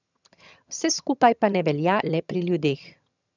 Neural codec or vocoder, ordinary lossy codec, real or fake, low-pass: vocoder, 22.05 kHz, 80 mel bands, HiFi-GAN; none; fake; 7.2 kHz